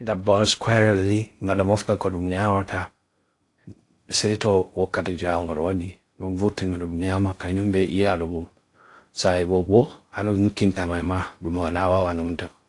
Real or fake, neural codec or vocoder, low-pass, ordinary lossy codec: fake; codec, 16 kHz in and 24 kHz out, 0.6 kbps, FocalCodec, streaming, 2048 codes; 10.8 kHz; AAC, 48 kbps